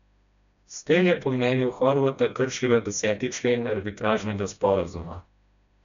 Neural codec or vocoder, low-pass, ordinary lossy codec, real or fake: codec, 16 kHz, 1 kbps, FreqCodec, smaller model; 7.2 kHz; none; fake